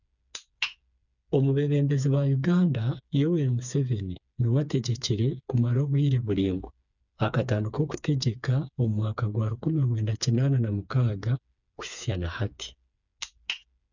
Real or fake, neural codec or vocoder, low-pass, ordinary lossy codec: fake; codec, 16 kHz, 4 kbps, FreqCodec, smaller model; 7.2 kHz; none